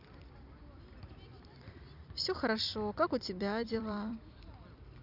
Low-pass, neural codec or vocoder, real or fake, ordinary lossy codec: 5.4 kHz; vocoder, 44.1 kHz, 128 mel bands every 256 samples, BigVGAN v2; fake; none